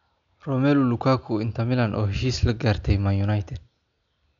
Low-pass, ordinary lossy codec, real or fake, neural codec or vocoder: 7.2 kHz; none; real; none